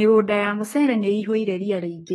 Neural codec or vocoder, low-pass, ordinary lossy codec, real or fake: codec, 32 kHz, 1.9 kbps, SNAC; 14.4 kHz; AAC, 32 kbps; fake